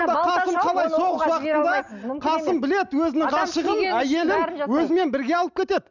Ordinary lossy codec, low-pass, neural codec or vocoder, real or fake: none; 7.2 kHz; none; real